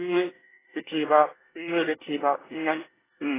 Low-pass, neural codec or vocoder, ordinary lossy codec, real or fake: 3.6 kHz; codec, 24 kHz, 1 kbps, SNAC; AAC, 16 kbps; fake